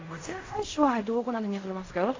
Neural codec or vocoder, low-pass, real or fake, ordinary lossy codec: codec, 16 kHz in and 24 kHz out, 0.4 kbps, LongCat-Audio-Codec, fine tuned four codebook decoder; 7.2 kHz; fake; AAC, 32 kbps